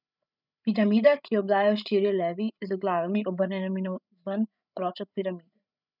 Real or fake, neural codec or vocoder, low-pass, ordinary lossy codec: fake; codec, 16 kHz, 8 kbps, FreqCodec, larger model; 5.4 kHz; none